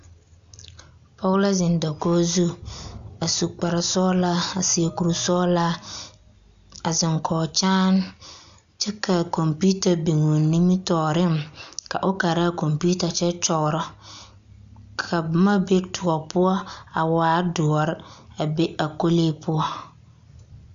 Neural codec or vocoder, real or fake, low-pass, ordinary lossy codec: none; real; 7.2 kHz; MP3, 64 kbps